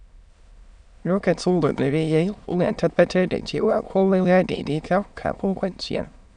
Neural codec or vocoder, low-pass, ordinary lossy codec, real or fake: autoencoder, 22.05 kHz, a latent of 192 numbers a frame, VITS, trained on many speakers; 9.9 kHz; none; fake